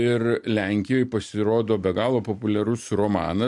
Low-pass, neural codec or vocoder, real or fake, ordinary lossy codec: 10.8 kHz; none; real; MP3, 64 kbps